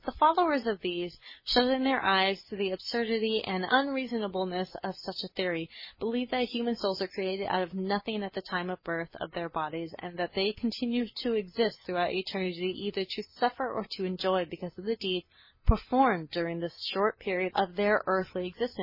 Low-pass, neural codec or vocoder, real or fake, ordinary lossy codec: 5.4 kHz; none; real; MP3, 24 kbps